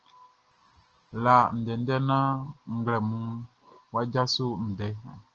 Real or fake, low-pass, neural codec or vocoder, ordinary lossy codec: real; 7.2 kHz; none; Opus, 16 kbps